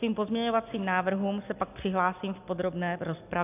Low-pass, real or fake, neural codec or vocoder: 3.6 kHz; real; none